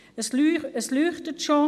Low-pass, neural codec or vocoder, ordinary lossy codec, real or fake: 14.4 kHz; none; none; real